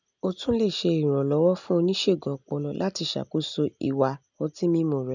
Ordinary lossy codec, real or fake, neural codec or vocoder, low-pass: none; real; none; 7.2 kHz